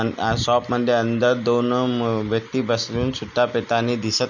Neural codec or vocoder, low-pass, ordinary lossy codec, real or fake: none; 7.2 kHz; none; real